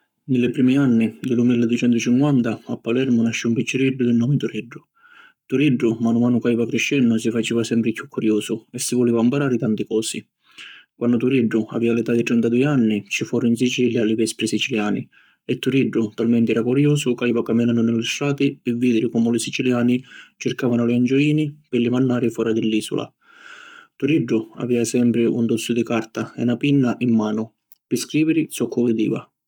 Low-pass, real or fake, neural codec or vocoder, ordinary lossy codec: 19.8 kHz; fake; codec, 44.1 kHz, 7.8 kbps, Pupu-Codec; none